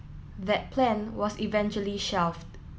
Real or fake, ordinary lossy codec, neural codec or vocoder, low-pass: real; none; none; none